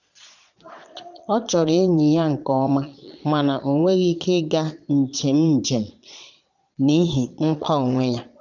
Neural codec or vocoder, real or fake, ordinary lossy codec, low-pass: vocoder, 22.05 kHz, 80 mel bands, Vocos; fake; none; 7.2 kHz